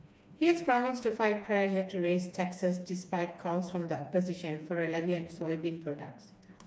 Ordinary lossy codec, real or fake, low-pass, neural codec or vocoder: none; fake; none; codec, 16 kHz, 2 kbps, FreqCodec, smaller model